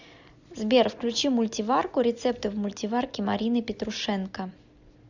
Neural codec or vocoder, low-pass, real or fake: none; 7.2 kHz; real